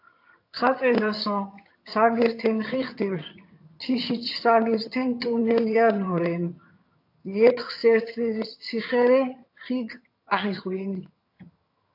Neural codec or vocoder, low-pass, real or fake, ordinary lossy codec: vocoder, 22.05 kHz, 80 mel bands, HiFi-GAN; 5.4 kHz; fake; AAC, 32 kbps